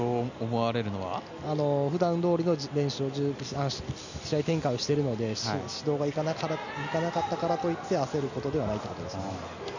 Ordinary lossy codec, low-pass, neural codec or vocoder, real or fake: none; 7.2 kHz; none; real